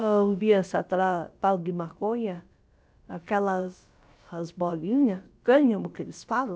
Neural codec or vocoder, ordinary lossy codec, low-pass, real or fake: codec, 16 kHz, about 1 kbps, DyCAST, with the encoder's durations; none; none; fake